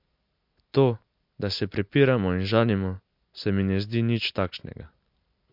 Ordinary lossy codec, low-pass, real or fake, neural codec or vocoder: MP3, 48 kbps; 5.4 kHz; real; none